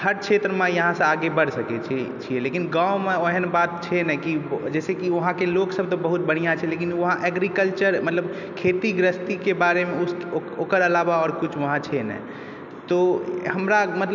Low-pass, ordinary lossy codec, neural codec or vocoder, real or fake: 7.2 kHz; none; none; real